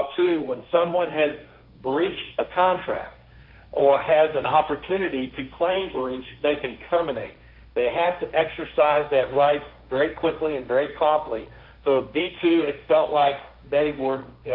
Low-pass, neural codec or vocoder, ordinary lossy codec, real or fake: 5.4 kHz; codec, 16 kHz, 1.1 kbps, Voila-Tokenizer; AAC, 48 kbps; fake